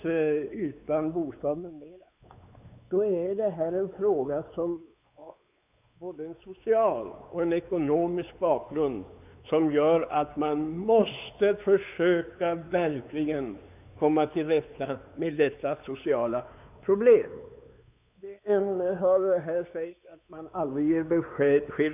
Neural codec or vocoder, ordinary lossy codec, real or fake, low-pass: codec, 16 kHz, 4 kbps, X-Codec, WavLM features, trained on Multilingual LibriSpeech; none; fake; 3.6 kHz